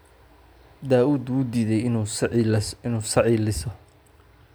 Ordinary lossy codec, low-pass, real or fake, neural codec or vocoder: none; none; real; none